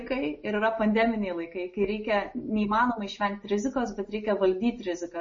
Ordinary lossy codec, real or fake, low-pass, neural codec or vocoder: MP3, 32 kbps; real; 7.2 kHz; none